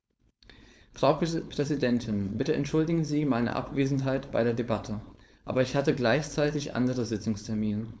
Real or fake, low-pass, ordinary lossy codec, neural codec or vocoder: fake; none; none; codec, 16 kHz, 4.8 kbps, FACodec